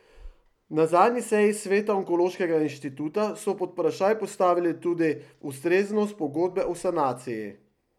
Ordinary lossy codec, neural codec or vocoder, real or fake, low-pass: none; none; real; 19.8 kHz